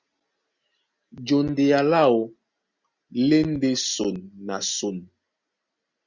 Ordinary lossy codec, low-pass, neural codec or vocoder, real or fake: Opus, 64 kbps; 7.2 kHz; none; real